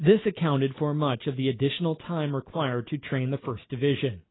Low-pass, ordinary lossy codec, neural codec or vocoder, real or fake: 7.2 kHz; AAC, 16 kbps; none; real